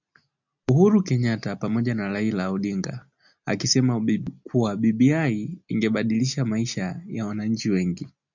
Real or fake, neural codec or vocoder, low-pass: real; none; 7.2 kHz